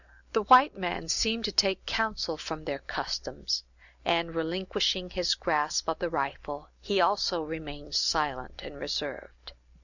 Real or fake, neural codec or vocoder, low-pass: real; none; 7.2 kHz